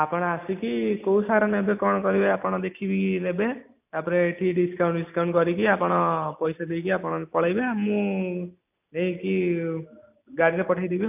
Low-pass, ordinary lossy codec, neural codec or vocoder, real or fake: 3.6 kHz; AAC, 32 kbps; none; real